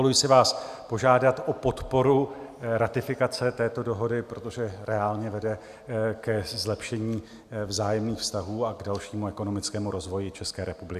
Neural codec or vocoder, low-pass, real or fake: none; 14.4 kHz; real